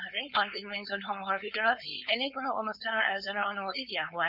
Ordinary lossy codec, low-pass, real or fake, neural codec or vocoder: none; 5.4 kHz; fake; codec, 16 kHz, 4.8 kbps, FACodec